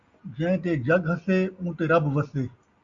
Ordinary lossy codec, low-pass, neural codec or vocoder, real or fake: Opus, 64 kbps; 7.2 kHz; none; real